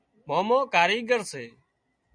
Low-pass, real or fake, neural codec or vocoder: 9.9 kHz; real; none